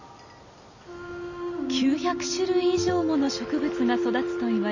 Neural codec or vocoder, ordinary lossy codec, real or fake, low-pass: none; none; real; 7.2 kHz